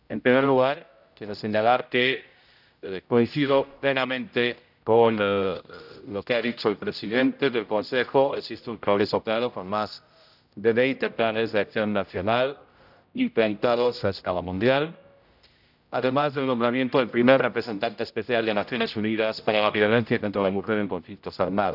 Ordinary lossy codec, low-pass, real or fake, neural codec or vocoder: none; 5.4 kHz; fake; codec, 16 kHz, 0.5 kbps, X-Codec, HuBERT features, trained on general audio